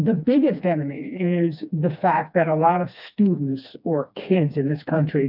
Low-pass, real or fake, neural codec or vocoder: 5.4 kHz; fake; codec, 16 kHz, 2 kbps, FreqCodec, smaller model